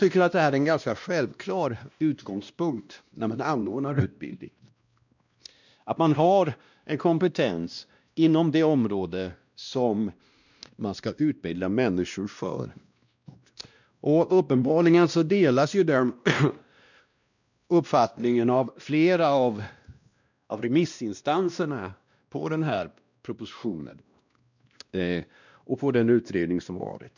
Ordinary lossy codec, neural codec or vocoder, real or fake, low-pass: none; codec, 16 kHz, 1 kbps, X-Codec, WavLM features, trained on Multilingual LibriSpeech; fake; 7.2 kHz